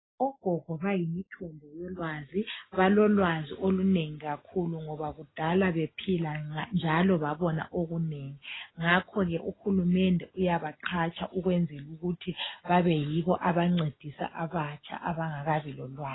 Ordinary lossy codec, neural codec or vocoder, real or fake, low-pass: AAC, 16 kbps; none; real; 7.2 kHz